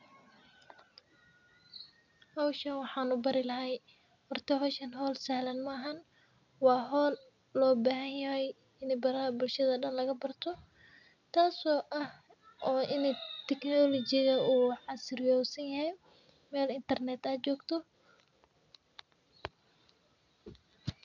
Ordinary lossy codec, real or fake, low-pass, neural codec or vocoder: none; real; 7.2 kHz; none